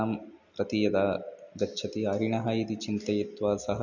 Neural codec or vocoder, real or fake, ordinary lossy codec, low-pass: none; real; none; 7.2 kHz